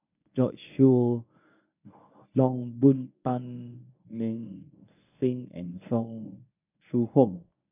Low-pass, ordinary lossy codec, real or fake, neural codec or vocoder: 3.6 kHz; none; fake; codec, 24 kHz, 0.9 kbps, WavTokenizer, medium speech release version 1